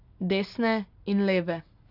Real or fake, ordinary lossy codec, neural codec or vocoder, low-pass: real; none; none; 5.4 kHz